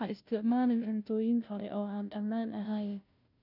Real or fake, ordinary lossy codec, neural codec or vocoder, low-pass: fake; none; codec, 16 kHz, 0.5 kbps, FunCodec, trained on Chinese and English, 25 frames a second; 5.4 kHz